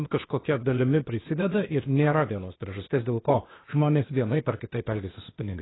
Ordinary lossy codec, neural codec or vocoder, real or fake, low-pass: AAC, 16 kbps; codec, 16 kHz, 0.8 kbps, ZipCodec; fake; 7.2 kHz